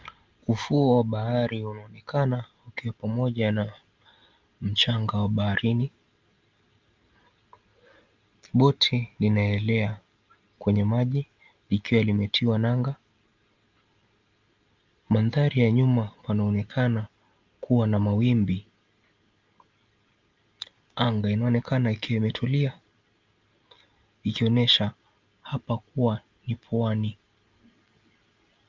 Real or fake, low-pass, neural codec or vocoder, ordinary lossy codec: real; 7.2 kHz; none; Opus, 24 kbps